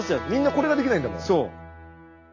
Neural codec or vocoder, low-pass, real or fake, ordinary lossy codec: none; 7.2 kHz; real; AAC, 32 kbps